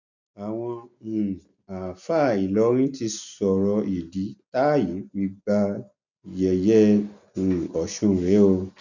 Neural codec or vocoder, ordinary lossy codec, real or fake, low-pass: none; none; real; 7.2 kHz